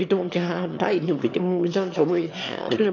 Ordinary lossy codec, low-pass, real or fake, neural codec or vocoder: AAC, 48 kbps; 7.2 kHz; fake; autoencoder, 22.05 kHz, a latent of 192 numbers a frame, VITS, trained on one speaker